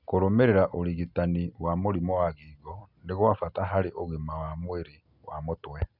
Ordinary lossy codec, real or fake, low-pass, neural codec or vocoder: none; real; 5.4 kHz; none